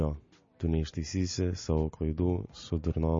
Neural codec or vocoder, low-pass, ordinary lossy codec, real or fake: none; 7.2 kHz; MP3, 32 kbps; real